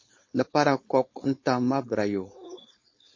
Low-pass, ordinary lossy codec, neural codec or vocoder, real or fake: 7.2 kHz; MP3, 32 kbps; codec, 16 kHz, 4.8 kbps, FACodec; fake